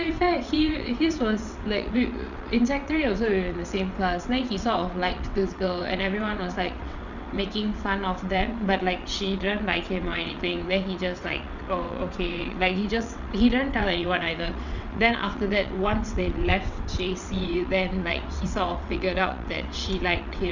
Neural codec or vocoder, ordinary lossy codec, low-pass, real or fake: vocoder, 22.05 kHz, 80 mel bands, WaveNeXt; none; 7.2 kHz; fake